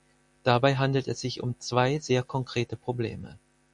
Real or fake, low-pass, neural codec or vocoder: real; 10.8 kHz; none